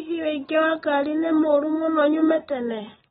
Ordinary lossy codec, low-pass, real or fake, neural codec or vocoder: AAC, 16 kbps; 19.8 kHz; real; none